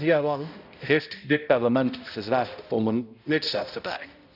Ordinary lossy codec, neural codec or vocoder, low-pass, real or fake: none; codec, 16 kHz, 0.5 kbps, X-Codec, HuBERT features, trained on balanced general audio; 5.4 kHz; fake